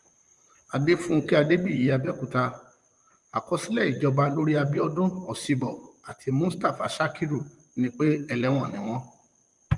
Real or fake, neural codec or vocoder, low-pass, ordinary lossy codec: fake; vocoder, 44.1 kHz, 128 mel bands, Pupu-Vocoder; 10.8 kHz; Opus, 32 kbps